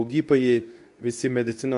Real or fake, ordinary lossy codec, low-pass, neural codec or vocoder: fake; AAC, 64 kbps; 10.8 kHz; codec, 24 kHz, 0.9 kbps, WavTokenizer, medium speech release version 2